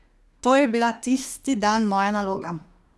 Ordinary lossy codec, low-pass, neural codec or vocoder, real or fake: none; none; codec, 24 kHz, 1 kbps, SNAC; fake